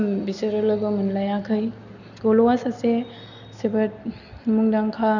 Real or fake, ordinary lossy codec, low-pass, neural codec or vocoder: real; none; 7.2 kHz; none